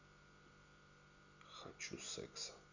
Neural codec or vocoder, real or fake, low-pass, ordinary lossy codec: none; real; 7.2 kHz; none